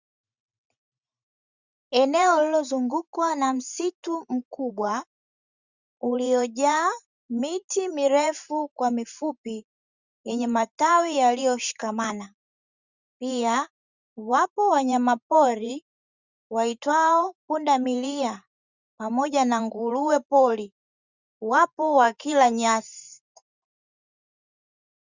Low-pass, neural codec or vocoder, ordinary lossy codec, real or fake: 7.2 kHz; vocoder, 44.1 kHz, 128 mel bands every 512 samples, BigVGAN v2; Opus, 64 kbps; fake